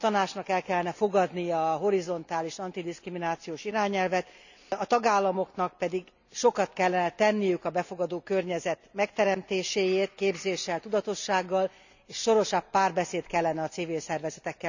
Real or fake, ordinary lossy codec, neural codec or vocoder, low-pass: real; none; none; 7.2 kHz